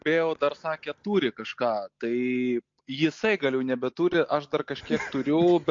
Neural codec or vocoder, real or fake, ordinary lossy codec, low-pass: none; real; MP3, 48 kbps; 7.2 kHz